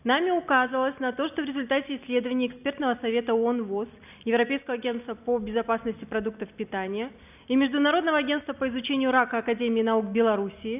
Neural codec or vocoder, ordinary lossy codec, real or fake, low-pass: none; none; real; 3.6 kHz